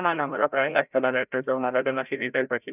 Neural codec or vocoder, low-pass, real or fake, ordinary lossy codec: codec, 16 kHz, 0.5 kbps, FreqCodec, larger model; 3.6 kHz; fake; none